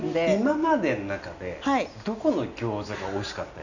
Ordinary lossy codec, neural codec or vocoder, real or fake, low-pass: none; none; real; 7.2 kHz